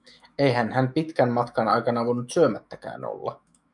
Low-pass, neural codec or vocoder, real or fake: 10.8 kHz; autoencoder, 48 kHz, 128 numbers a frame, DAC-VAE, trained on Japanese speech; fake